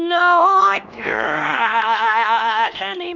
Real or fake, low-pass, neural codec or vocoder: fake; 7.2 kHz; codec, 16 kHz, 1 kbps, X-Codec, HuBERT features, trained on LibriSpeech